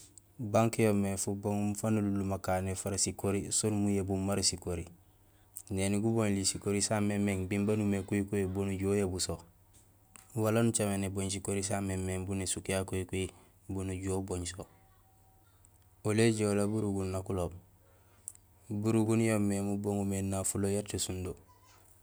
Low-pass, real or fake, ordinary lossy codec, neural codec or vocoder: none; real; none; none